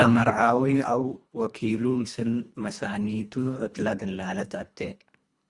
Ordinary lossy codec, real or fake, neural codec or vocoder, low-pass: Opus, 64 kbps; fake; codec, 24 kHz, 1.5 kbps, HILCodec; 10.8 kHz